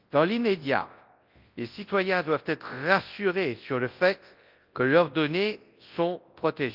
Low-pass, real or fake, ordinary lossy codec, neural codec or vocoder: 5.4 kHz; fake; Opus, 32 kbps; codec, 24 kHz, 0.9 kbps, WavTokenizer, large speech release